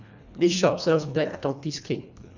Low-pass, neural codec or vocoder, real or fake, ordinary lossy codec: 7.2 kHz; codec, 24 kHz, 1.5 kbps, HILCodec; fake; none